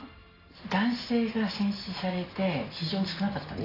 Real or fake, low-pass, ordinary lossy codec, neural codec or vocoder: real; 5.4 kHz; AAC, 24 kbps; none